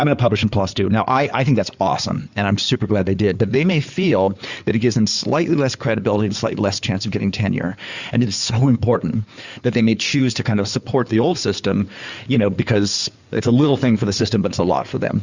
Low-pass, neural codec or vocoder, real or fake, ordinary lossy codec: 7.2 kHz; codec, 16 kHz in and 24 kHz out, 2.2 kbps, FireRedTTS-2 codec; fake; Opus, 64 kbps